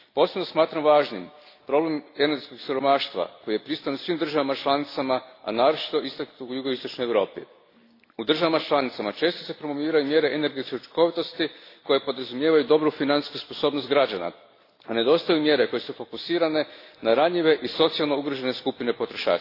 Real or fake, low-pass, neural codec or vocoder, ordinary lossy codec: real; 5.4 kHz; none; AAC, 32 kbps